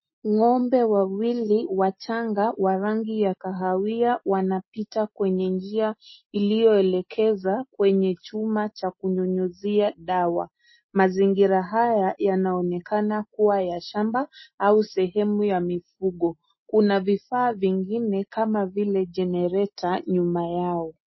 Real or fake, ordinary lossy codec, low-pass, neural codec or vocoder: real; MP3, 24 kbps; 7.2 kHz; none